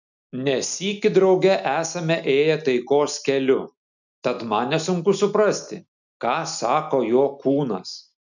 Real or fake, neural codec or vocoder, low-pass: real; none; 7.2 kHz